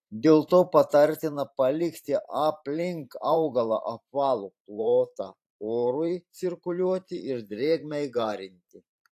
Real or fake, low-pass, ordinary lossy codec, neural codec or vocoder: fake; 14.4 kHz; AAC, 64 kbps; vocoder, 44.1 kHz, 128 mel bands every 512 samples, BigVGAN v2